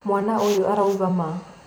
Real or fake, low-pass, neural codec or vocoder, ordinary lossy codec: fake; none; vocoder, 44.1 kHz, 128 mel bands every 256 samples, BigVGAN v2; none